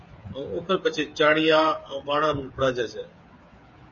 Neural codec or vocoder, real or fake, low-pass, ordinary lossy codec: codec, 16 kHz, 8 kbps, FreqCodec, smaller model; fake; 7.2 kHz; MP3, 32 kbps